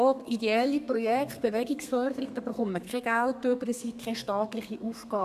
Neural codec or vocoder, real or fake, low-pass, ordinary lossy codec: codec, 32 kHz, 1.9 kbps, SNAC; fake; 14.4 kHz; none